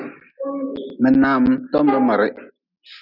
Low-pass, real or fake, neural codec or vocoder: 5.4 kHz; real; none